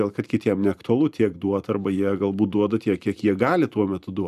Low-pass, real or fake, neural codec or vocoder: 14.4 kHz; fake; vocoder, 44.1 kHz, 128 mel bands every 512 samples, BigVGAN v2